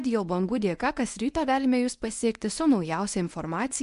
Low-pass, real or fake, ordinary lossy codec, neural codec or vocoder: 10.8 kHz; fake; MP3, 64 kbps; codec, 24 kHz, 0.9 kbps, WavTokenizer, medium speech release version 1